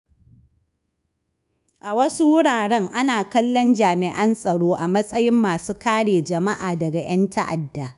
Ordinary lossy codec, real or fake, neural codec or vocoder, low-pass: none; fake; codec, 24 kHz, 1.2 kbps, DualCodec; 10.8 kHz